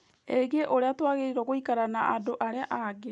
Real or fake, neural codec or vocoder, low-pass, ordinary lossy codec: real; none; 10.8 kHz; none